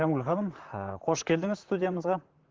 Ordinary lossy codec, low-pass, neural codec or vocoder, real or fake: Opus, 16 kbps; 7.2 kHz; vocoder, 44.1 kHz, 128 mel bands, Pupu-Vocoder; fake